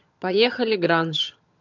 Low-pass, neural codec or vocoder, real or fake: 7.2 kHz; vocoder, 22.05 kHz, 80 mel bands, HiFi-GAN; fake